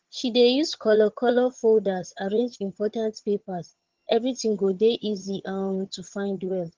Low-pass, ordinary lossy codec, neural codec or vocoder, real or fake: 7.2 kHz; Opus, 16 kbps; vocoder, 22.05 kHz, 80 mel bands, HiFi-GAN; fake